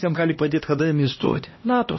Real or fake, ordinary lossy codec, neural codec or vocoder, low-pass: fake; MP3, 24 kbps; codec, 16 kHz, 1 kbps, X-Codec, HuBERT features, trained on LibriSpeech; 7.2 kHz